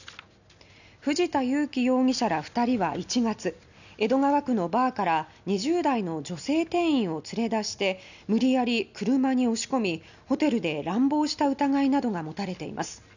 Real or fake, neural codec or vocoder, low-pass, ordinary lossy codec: real; none; 7.2 kHz; none